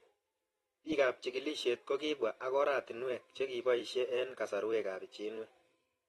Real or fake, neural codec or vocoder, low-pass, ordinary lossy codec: fake; vocoder, 44.1 kHz, 128 mel bands every 512 samples, BigVGAN v2; 19.8 kHz; AAC, 32 kbps